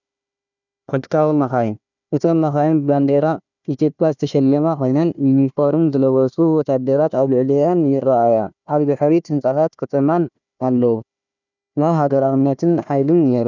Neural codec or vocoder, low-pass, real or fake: codec, 16 kHz, 1 kbps, FunCodec, trained on Chinese and English, 50 frames a second; 7.2 kHz; fake